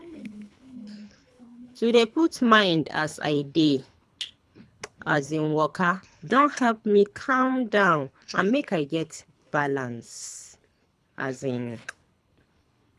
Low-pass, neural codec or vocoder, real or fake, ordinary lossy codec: none; codec, 24 kHz, 3 kbps, HILCodec; fake; none